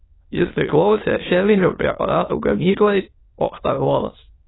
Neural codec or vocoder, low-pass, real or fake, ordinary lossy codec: autoencoder, 22.05 kHz, a latent of 192 numbers a frame, VITS, trained on many speakers; 7.2 kHz; fake; AAC, 16 kbps